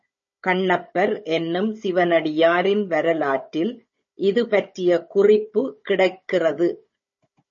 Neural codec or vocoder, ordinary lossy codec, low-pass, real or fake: codec, 16 kHz, 16 kbps, FunCodec, trained on Chinese and English, 50 frames a second; MP3, 32 kbps; 7.2 kHz; fake